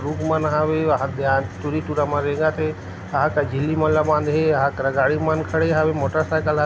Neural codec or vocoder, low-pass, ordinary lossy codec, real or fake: none; none; none; real